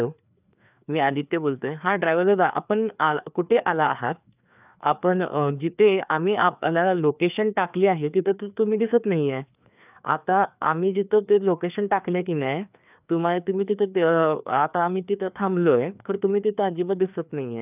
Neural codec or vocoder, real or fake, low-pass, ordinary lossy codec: codec, 16 kHz, 2 kbps, FreqCodec, larger model; fake; 3.6 kHz; none